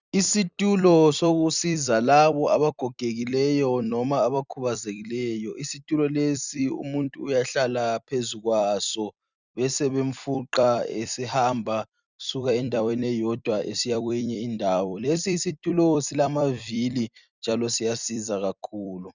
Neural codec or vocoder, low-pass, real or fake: vocoder, 44.1 kHz, 128 mel bands every 256 samples, BigVGAN v2; 7.2 kHz; fake